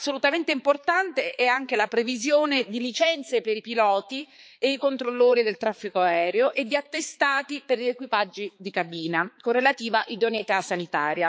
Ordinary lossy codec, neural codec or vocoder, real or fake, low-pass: none; codec, 16 kHz, 4 kbps, X-Codec, HuBERT features, trained on balanced general audio; fake; none